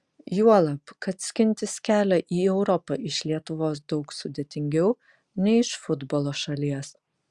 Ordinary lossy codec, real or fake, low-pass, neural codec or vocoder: Opus, 64 kbps; real; 10.8 kHz; none